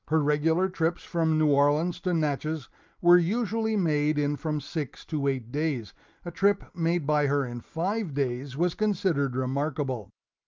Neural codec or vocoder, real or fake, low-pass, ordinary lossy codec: none; real; 7.2 kHz; Opus, 24 kbps